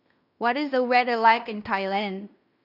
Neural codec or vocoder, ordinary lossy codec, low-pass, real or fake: codec, 16 kHz in and 24 kHz out, 0.9 kbps, LongCat-Audio-Codec, fine tuned four codebook decoder; none; 5.4 kHz; fake